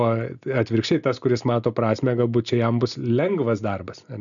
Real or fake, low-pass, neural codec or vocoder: real; 7.2 kHz; none